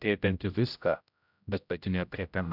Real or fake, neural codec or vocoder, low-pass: fake; codec, 16 kHz, 0.5 kbps, X-Codec, HuBERT features, trained on general audio; 5.4 kHz